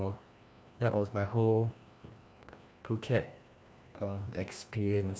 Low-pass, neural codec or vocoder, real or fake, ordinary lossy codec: none; codec, 16 kHz, 1 kbps, FreqCodec, larger model; fake; none